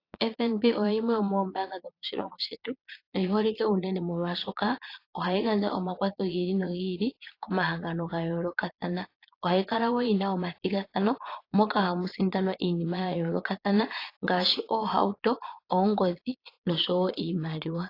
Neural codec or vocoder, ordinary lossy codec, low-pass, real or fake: vocoder, 22.05 kHz, 80 mel bands, Vocos; AAC, 32 kbps; 5.4 kHz; fake